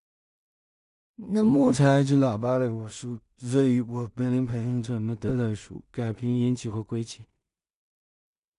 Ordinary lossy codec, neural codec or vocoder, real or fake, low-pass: none; codec, 16 kHz in and 24 kHz out, 0.4 kbps, LongCat-Audio-Codec, two codebook decoder; fake; 10.8 kHz